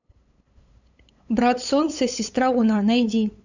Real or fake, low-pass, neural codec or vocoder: fake; 7.2 kHz; codec, 16 kHz, 8 kbps, FunCodec, trained on LibriTTS, 25 frames a second